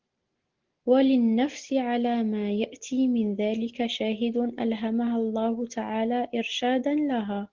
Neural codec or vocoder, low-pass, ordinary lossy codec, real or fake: none; 7.2 kHz; Opus, 16 kbps; real